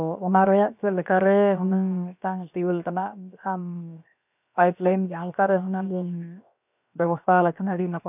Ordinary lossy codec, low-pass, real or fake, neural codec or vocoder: none; 3.6 kHz; fake; codec, 16 kHz, 0.7 kbps, FocalCodec